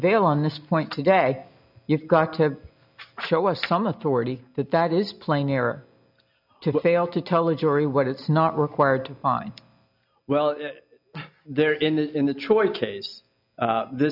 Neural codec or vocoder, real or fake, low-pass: none; real; 5.4 kHz